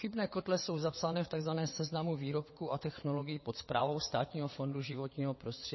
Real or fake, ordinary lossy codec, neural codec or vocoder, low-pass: fake; MP3, 24 kbps; vocoder, 22.05 kHz, 80 mel bands, WaveNeXt; 7.2 kHz